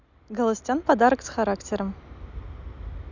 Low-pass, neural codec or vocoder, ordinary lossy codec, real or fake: 7.2 kHz; none; none; real